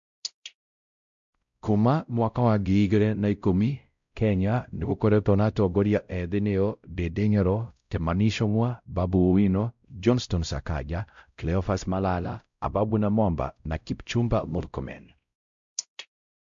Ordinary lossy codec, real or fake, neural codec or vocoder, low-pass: MP3, 64 kbps; fake; codec, 16 kHz, 0.5 kbps, X-Codec, WavLM features, trained on Multilingual LibriSpeech; 7.2 kHz